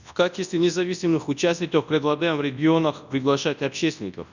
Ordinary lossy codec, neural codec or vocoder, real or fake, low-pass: none; codec, 24 kHz, 0.9 kbps, WavTokenizer, large speech release; fake; 7.2 kHz